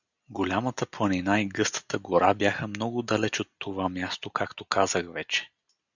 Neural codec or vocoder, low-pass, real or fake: none; 7.2 kHz; real